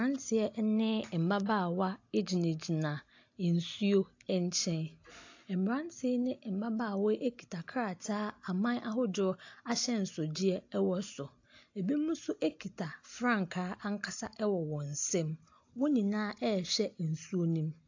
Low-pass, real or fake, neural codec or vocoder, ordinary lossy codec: 7.2 kHz; real; none; AAC, 48 kbps